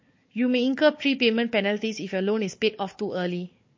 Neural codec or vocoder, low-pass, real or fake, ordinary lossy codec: codec, 16 kHz, 4 kbps, FunCodec, trained on Chinese and English, 50 frames a second; 7.2 kHz; fake; MP3, 32 kbps